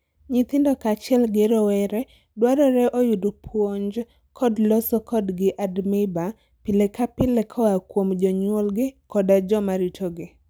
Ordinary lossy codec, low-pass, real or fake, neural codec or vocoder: none; none; real; none